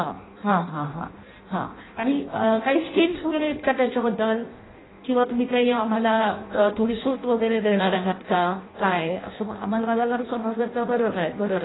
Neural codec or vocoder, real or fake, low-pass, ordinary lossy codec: codec, 16 kHz in and 24 kHz out, 0.6 kbps, FireRedTTS-2 codec; fake; 7.2 kHz; AAC, 16 kbps